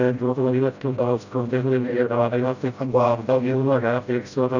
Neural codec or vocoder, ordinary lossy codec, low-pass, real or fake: codec, 16 kHz, 0.5 kbps, FreqCodec, smaller model; none; 7.2 kHz; fake